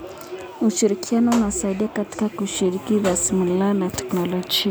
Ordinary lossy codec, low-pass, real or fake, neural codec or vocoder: none; none; real; none